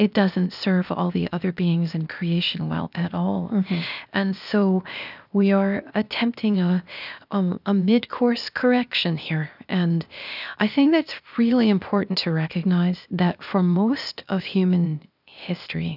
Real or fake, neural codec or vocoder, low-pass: fake; codec, 16 kHz, 0.8 kbps, ZipCodec; 5.4 kHz